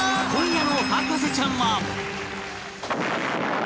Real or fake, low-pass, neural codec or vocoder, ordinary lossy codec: real; none; none; none